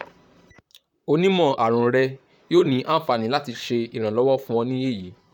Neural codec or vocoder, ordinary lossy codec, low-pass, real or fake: vocoder, 44.1 kHz, 128 mel bands, Pupu-Vocoder; none; 19.8 kHz; fake